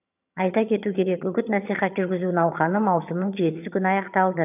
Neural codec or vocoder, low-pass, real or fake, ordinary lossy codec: vocoder, 22.05 kHz, 80 mel bands, HiFi-GAN; 3.6 kHz; fake; none